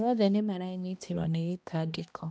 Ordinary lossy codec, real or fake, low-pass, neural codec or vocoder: none; fake; none; codec, 16 kHz, 1 kbps, X-Codec, HuBERT features, trained on balanced general audio